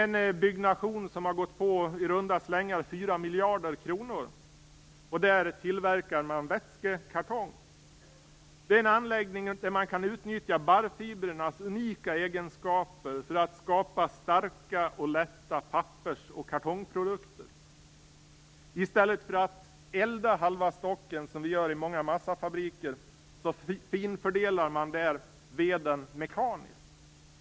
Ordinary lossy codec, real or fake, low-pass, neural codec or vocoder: none; real; none; none